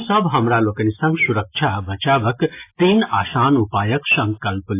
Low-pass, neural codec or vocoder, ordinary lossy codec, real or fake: 3.6 kHz; none; AAC, 24 kbps; real